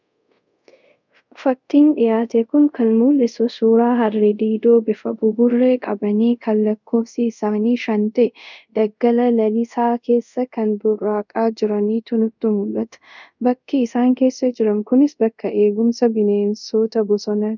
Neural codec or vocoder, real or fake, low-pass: codec, 24 kHz, 0.5 kbps, DualCodec; fake; 7.2 kHz